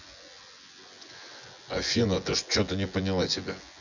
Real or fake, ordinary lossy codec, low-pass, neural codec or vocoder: fake; none; 7.2 kHz; vocoder, 24 kHz, 100 mel bands, Vocos